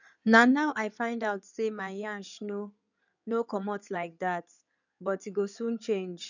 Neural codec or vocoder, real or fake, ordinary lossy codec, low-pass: codec, 16 kHz in and 24 kHz out, 2.2 kbps, FireRedTTS-2 codec; fake; none; 7.2 kHz